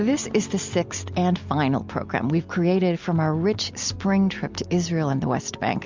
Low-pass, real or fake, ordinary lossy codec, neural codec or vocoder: 7.2 kHz; real; MP3, 64 kbps; none